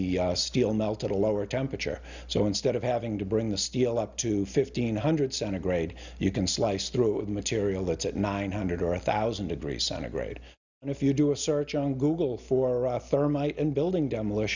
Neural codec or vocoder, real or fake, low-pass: none; real; 7.2 kHz